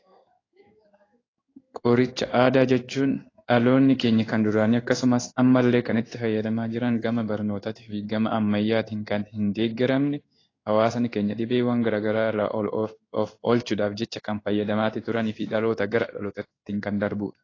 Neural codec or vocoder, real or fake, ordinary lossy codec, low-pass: codec, 16 kHz in and 24 kHz out, 1 kbps, XY-Tokenizer; fake; AAC, 32 kbps; 7.2 kHz